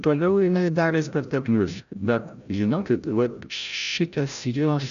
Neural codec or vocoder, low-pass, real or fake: codec, 16 kHz, 0.5 kbps, FreqCodec, larger model; 7.2 kHz; fake